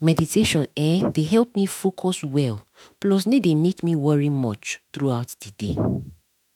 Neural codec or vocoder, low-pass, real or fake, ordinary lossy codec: autoencoder, 48 kHz, 32 numbers a frame, DAC-VAE, trained on Japanese speech; 19.8 kHz; fake; none